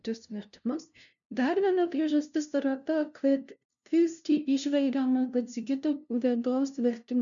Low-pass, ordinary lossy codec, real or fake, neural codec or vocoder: 7.2 kHz; MP3, 96 kbps; fake; codec, 16 kHz, 0.5 kbps, FunCodec, trained on LibriTTS, 25 frames a second